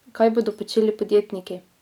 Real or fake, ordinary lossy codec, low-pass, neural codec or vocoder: real; none; 19.8 kHz; none